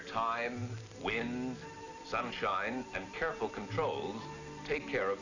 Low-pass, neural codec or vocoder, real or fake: 7.2 kHz; none; real